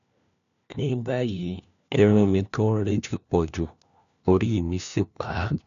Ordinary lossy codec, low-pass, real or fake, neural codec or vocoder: none; 7.2 kHz; fake; codec, 16 kHz, 1 kbps, FunCodec, trained on LibriTTS, 50 frames a second